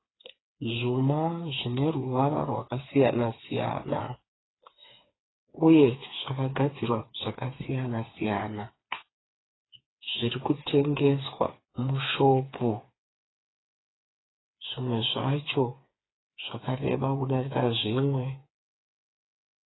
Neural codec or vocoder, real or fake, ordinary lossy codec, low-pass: codec, 16 kHz, 4 kbps, FreqCodec, smaller model; fake; AAC, 16 kbps; 7.2 kHz